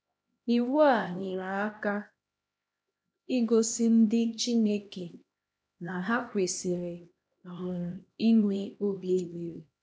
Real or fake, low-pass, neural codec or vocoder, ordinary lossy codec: fake; none; codec, 16 kHz, 1 kbps, X-Codec, HuBERT features, trained on LibriSpeech; none